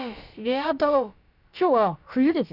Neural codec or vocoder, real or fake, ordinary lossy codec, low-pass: codec, 16 kHz, about 1 kbps, DyCAST, with the encoder's durations; fake; none; 5.4 kHz